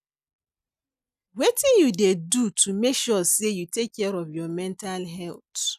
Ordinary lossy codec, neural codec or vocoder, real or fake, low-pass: none; none; real; 14.4 kHz